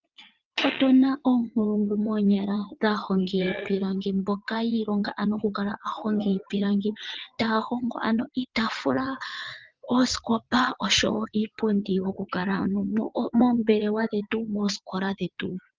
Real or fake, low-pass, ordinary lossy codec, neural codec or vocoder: fake; 7.2 kHz; Opus, 24 kbps; vocoder, 22.05 kHz, 80 mel bands, WaveNeXt